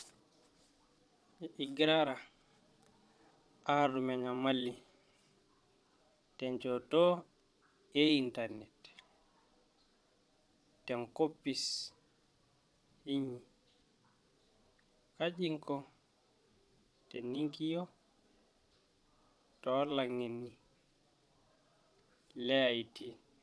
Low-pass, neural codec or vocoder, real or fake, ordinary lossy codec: none; vocoder, 22.05 kHz, 80 mel bands, Vocos; fake; none